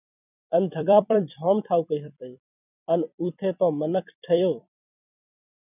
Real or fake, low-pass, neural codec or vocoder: fake; 3.6 kHz; vocoder, 44.1 kHz, 128 mel bands every 256 samples, BigVGAN v2